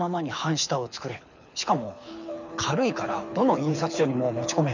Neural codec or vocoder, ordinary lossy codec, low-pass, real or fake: codec, 24 kHz, 6 kbps, HILCodec; none; 7.2 kHz; fake